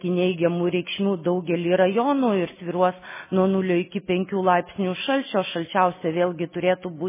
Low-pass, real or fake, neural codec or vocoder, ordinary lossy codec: 3.6 kHz; real; none; MP3, 16 kbps